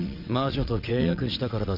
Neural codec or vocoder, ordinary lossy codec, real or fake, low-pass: vocoder, 22.05 kHz, 80 mel bands, WaveNeXt; none; fake; 5.4 kHz